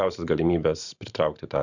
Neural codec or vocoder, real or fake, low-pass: vocoder, 44.1 kHz, 128 mel bands every 512 samples, BigVGAN v2; fake; 7.2 kHz